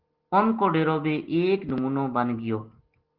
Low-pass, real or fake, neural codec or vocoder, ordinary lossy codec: 5.4 kHz; real; none; Opus, 16 kbps